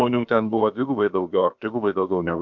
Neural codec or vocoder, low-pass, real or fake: codec, 16 kHz, about 1 kbps, DyCAST, with the encoder's durations; 7.2 kHz; fake